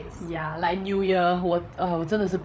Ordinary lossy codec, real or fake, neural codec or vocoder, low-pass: none; fake; codec, 16 kHz, 8 kbps, FreqCodec, larger model; none